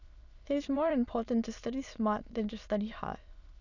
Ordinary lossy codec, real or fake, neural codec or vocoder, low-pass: none; fake; autoencoder, 22.05 kHz, a latent of 192 numbers a frame, VITS, trained on many speakers; 7.2 kHz